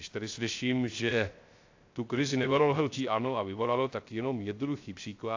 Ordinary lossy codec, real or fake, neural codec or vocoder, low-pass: AAC, 48 kbps; fake; codec, 16 kHz, 0.3 kbps, FocalCodec; 7.2 kHz